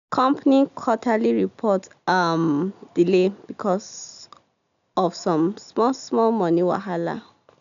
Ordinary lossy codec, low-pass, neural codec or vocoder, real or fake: MP3, 96 kbps; 7.2 kHz; none; real